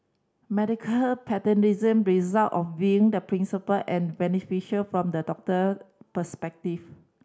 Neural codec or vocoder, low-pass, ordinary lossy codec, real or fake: none; none; none; real